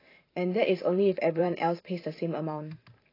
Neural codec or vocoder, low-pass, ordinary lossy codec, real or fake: vocoder, 44.1 kHz, 128 mel bands every 512 samples, BigVGAN v2; 5.4 kHz; AAC, 24 kbps; fake